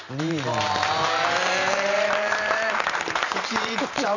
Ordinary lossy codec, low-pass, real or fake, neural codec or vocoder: none; 7.2 kHz; fake; vocoder, 44.1 kHz, 128 mel bands every 512 samples, BigVGAN v2